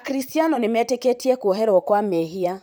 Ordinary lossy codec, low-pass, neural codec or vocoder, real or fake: none; none; vocoder, 44.1 kHz, 128 mel bands, Pupu-Vocoder; fake